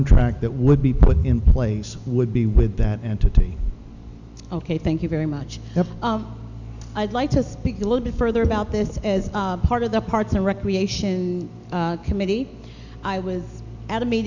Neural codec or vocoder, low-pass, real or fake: none; 7.2 kHz; real